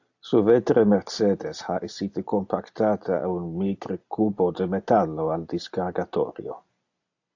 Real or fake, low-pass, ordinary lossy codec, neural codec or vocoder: real; 7.2 kHz; AAC, 48 kbps; none